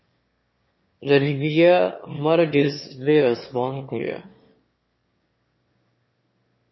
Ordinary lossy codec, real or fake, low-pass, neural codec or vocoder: MP3, 24 kbps; fake; 7.2 kHz; autoencoder, 22.05 kHz, a latent of 192 numbers a frame, VITS, trained on one speaker